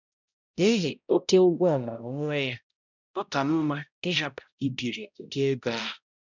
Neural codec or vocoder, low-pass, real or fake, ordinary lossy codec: codec, 16 kHz, 0.5 kbps, X-Codec, HuBERT features, trained on balanced general audio; 7.2 kHz; fake; none